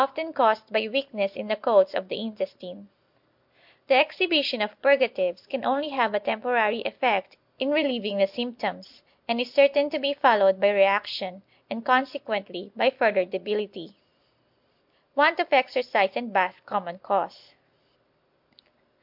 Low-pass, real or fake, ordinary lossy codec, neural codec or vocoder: 5.4 kHz; real; MP3, 48 kbps; none